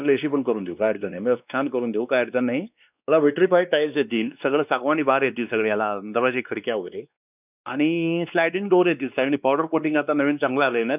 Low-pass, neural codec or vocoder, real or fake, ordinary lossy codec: 3.6 kHz; codec, 16 kHz, 2 kbps, X-Codec, WavLM features, trained on Multilingual LibriSpeech; fake; none